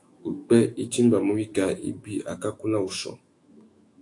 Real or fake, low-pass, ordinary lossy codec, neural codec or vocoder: fake; 10.8 kHz; AAC, 48 kbps; autoencoder, 48 kHz, 128 numbers a frame, DAC-VAE, trained on Japanese speech